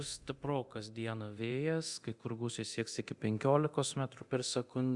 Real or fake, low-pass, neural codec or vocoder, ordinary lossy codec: fake; 10.8 kHz; codec, 24 kHz, 0.9 kbps, DualCodec; Opus, 64 kbps